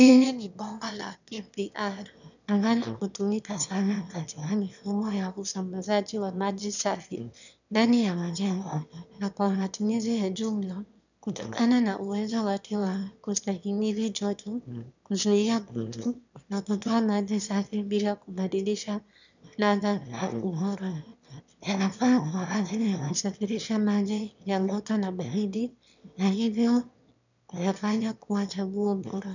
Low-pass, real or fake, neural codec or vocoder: 7.2 kHz; fake; autoencoder, 22.05 kHz, a latent of 192 numbers a frame, VITS, trained on one speaker